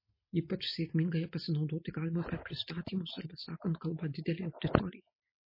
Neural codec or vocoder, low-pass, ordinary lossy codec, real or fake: none; 5.4 kHz; MP3, 24 kbps; real